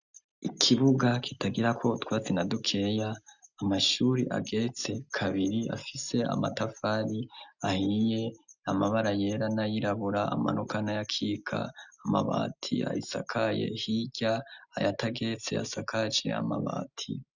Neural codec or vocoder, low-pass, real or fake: none; 7.2 kHz; real